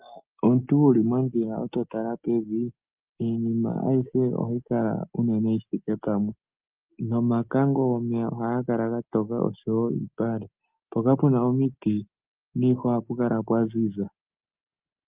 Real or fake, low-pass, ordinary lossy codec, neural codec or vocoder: real; 3.6 kHz; Opus, 24 kbps; none